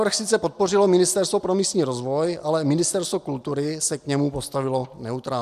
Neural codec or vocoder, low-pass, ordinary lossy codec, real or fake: none; 14.4 kHz; AAC, 96 kbps; real